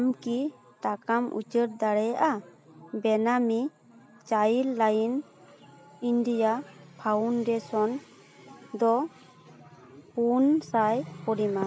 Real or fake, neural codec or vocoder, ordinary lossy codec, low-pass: real; none; none; none